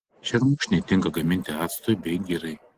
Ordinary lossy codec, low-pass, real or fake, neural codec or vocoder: Opus, 16 kbps; 14.4 kHz; real; none